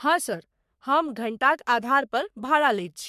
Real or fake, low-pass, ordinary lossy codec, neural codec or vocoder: fake; 14.4 kHz; MP3, 96 kbps; codec, 44.1 kHz, 3.4 kbps, Pupu-Codec